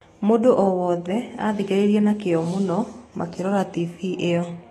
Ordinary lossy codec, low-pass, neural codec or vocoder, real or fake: AAC, 32 kbps; 19.8 kHz; autoencoder, 48 kHz, 128 numbers a frame, DAC-VAE, trained on Japanese speech; fake